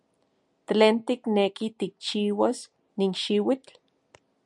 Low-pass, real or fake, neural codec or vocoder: 10.8 kHz; real; none